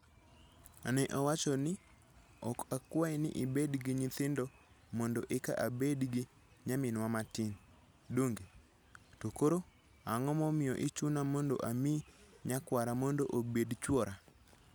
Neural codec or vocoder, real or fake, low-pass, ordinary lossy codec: none; real; none; none